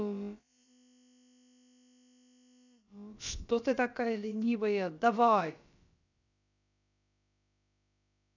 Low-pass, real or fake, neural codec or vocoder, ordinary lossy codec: 7.2 kHz; fake; codec, 16 kHz, about 1 kbps, DyCAST, with the encoder's durations; none